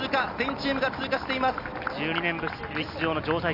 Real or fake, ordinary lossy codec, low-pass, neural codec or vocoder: real; none; 5.4 kHz; none